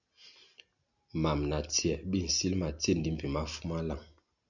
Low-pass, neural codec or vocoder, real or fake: 7.2 kHz; none; real